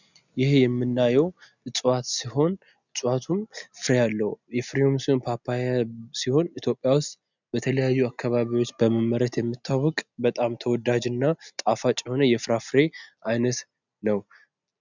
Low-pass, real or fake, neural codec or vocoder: 7.2 kHz; real; none